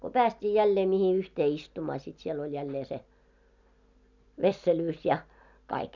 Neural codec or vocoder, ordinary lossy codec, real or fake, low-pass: none; none; real; 7.2 kHz